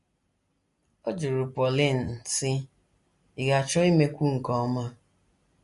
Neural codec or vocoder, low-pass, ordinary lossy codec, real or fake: none; 14.4 kHz; MP3, 48 kbps; real